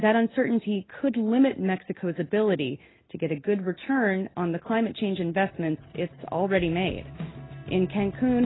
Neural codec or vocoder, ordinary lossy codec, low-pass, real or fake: none; AAC, 16 kbps; 7.2 kHz; real